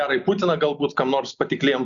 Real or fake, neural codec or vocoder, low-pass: real; none; 7.2 kHz